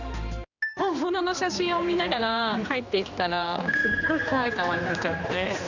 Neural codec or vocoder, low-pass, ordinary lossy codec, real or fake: codec, 16 kHz, 2 kbps, X-Codec, HuBERT features, trained on general audio; 7.2 kHz; none; fake